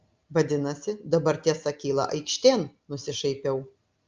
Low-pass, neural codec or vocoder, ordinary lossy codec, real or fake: 7.2 kHz; none; Opus, 24 kbps; real